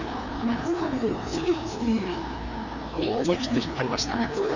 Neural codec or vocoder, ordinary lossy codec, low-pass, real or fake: codec, 16 kHz, 2 kbps, FreqCodec, larger model; none; 7.2 kHz; fake